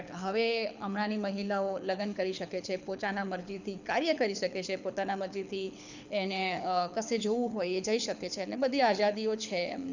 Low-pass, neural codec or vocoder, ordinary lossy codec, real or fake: 7.2 kHz; codec, 24 kHz, 6 kbps, HILCodec; none; fake